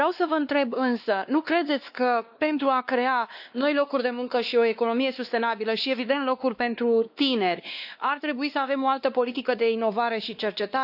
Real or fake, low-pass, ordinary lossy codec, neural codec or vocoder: fake; 5.4 kHz; AAC, 48 kbps; codec, 16 kHz, 2 kbps, X-Codec, WavLM features, trained on Multilingual LibriSpeech